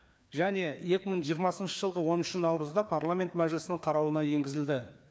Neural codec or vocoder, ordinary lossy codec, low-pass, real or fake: codec, 16 kHz, 2 kbps, FreqCodec, larger model; none; none; fake